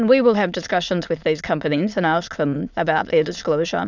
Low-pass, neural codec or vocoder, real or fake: 7.2 kHz; autoencoder, 22.05 kHz, a latent of 192 numbers a frame, VITS, trained on many speakers; fake